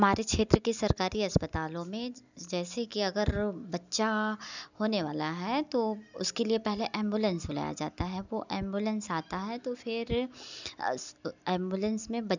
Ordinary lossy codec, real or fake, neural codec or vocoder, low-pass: none; real; none; 7.2 kHz